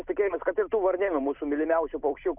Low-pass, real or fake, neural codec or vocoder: 3.6 kHz; real; none